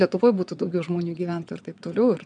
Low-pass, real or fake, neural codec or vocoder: 9.9 kHz; fake; vocoder, 22.05 kHz, 80 mel bands, WaveNeXt